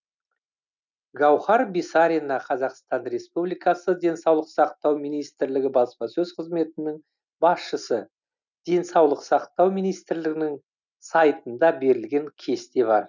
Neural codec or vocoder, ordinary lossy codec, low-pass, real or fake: none; none; 7.2 kHz; real